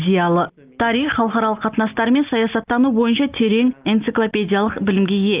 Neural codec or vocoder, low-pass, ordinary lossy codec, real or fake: none; 3.6 kHz; Opus, 32 kbps; real